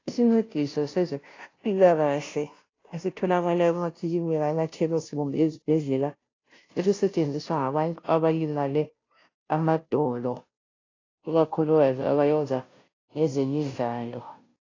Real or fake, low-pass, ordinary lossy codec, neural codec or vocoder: fake; 7.2 kHz; AAC, 32 kbps; codec, 16 kHz, 0.5 kbps, FunCodec, trained on Chinese and English, 25 frames a second